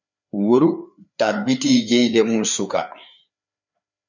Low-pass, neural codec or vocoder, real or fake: 7.2 kHz; codec, 16 kHz, 4 kbps, FreqCodec, larger model; fake